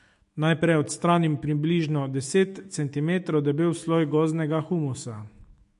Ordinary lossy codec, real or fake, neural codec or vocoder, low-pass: MP3, 48 kbps; fake; autoencoder, 48 kHz, 128 numbers a frame, DAC-VAE, trained on Japanese speech; 14.4 kHz